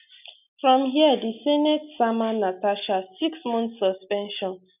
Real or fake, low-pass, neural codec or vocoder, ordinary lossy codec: real; 3.6 kHz; none; none